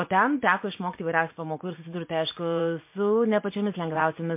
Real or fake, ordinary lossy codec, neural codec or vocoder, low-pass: fake; MP3, 24 kbps; vocoder, 44.1 kHz, 80 mel bands, Vocos; 3.6 kHz